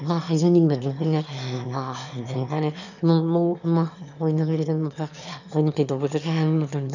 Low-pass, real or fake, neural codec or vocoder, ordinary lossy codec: 7.2 kHz; fake; autoencoder, 22.05 kHz, a latent of 192 numbers a frame, VITS, trained on one speaker; none